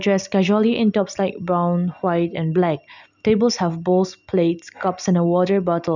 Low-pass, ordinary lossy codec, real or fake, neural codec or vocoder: 7.2 kHz; none; real; none